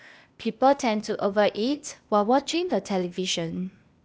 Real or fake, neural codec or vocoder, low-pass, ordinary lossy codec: fake; codec, 16 kHz, 0.8 kbps, ZipCodec; none; none